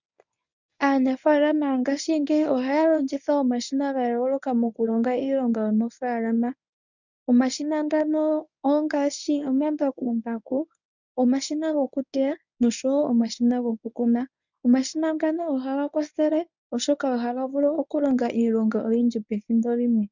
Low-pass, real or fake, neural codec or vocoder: 7.2 kHz; fake; codec, 24 kHz, 0.9 kbps, WavTokenizer, medium speech release version 1